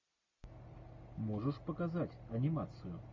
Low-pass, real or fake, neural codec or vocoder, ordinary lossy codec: 7.2 kHz; real; none; MP3, 64 kbps